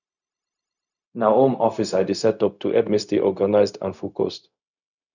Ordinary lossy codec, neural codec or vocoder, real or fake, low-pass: none; codec, 16 kHz, 0.4 kbps, LongCat-Audio-Codec; fake; 7.2 kHz